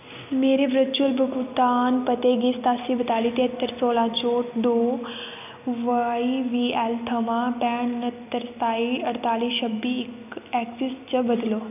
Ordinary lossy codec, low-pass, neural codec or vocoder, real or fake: none; 3.6 kHz; none; real